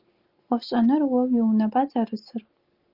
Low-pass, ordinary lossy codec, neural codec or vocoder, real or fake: 5.4 kHz; Opus, 32 kbps; none; real